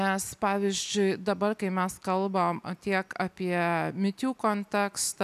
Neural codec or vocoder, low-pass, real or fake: none; 14.4 kHz; real